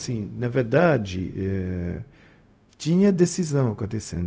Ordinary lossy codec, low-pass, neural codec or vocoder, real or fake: none; none; codec, 16 kHz, 0.4 kbps, LongCat-Audio-Codec; fake